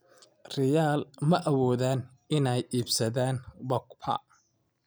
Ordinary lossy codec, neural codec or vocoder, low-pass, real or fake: none; none; none; real